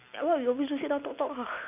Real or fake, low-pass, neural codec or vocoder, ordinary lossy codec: real; 3.6 kHz; none; none